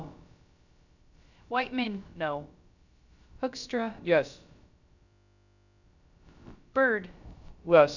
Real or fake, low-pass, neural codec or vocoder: fake; 7.2 kHz; codec, 16 kHz, about 1 kbps, DyCAST, with the encoder's durations